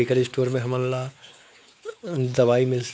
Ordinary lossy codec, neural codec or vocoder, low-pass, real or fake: none; codec, 16 kHz, 4 kbps, X-Codec, WavLM features, trained on Multilingual LibriSpeech; none; fake